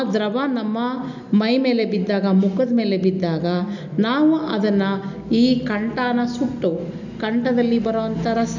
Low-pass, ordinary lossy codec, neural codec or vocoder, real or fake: 7.2 kHz; none; none; real